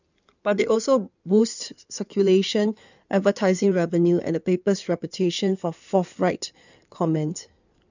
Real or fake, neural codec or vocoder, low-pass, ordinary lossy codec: fake; codec, 16 kHz in and 24 kHz out, 2.2 kbps, FireRedTTS-2 codec; 7.2 kHz; none